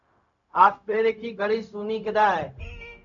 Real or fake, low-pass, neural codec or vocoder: fake; 7.2 kHz; codec, 16 kHz, 0.4 kbps, LongCat-Audio-Codec